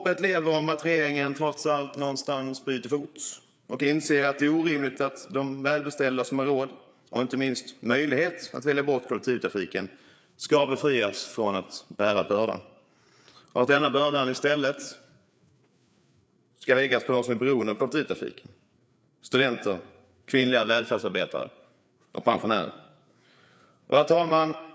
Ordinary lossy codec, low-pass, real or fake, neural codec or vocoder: none; none; fake; codec, 16 kHz, 4 kbps, FreqCodec, larger model